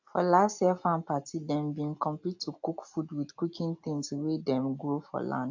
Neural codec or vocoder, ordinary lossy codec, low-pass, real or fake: none; AAC, 48 kbps; 7.2 kHz; real